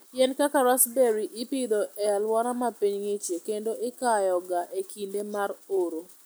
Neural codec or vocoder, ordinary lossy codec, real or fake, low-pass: none; none; real; none